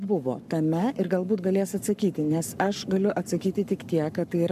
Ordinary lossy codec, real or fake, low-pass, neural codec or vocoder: MP3, 96 kbps; fake; 14.4 kHz; codec, 44.1 kHz, 7.8 kbps, Pupu-Codec